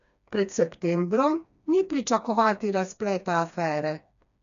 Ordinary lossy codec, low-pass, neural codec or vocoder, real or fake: none; 7.2 kHz; codec, 16 kHz, 2 kbps, FreqCodec, smaller model; fake